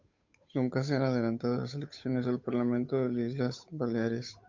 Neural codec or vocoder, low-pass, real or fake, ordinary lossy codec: codec, 16 kHz, 8 kbps, FunCodec, trained on Chinese and English, 25 frames a second; 7.2 kHz; fake; MP3, 48 kbps